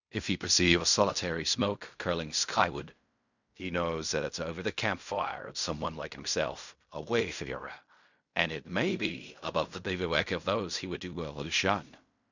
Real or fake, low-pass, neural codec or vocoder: fake; 7.2 kHz; codec, 16 kHz in and 24 kHz out, 0.4 kbps, LongCat-Audio-Codec, fine tuned four codebook decoder